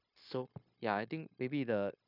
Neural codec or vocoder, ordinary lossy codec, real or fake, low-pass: codec, 16 kHz, 0.9 kbps, LongCat-Audio-Codec; none; fake; 5.4 kHz